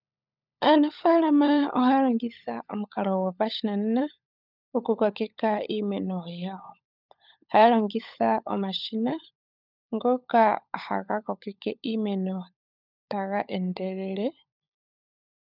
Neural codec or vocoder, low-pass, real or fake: codec, 16 kHz, 16 kbps, FunCodec, trained on LibriTTS, 50 frames a second; 5.4 kHz; fake